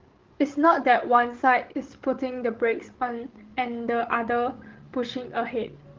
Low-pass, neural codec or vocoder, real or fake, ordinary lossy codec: 7.2 kHz; codec, 16 kHz, 16 kbps, FreqCodec, larger model; fake; Opus, 16 kbps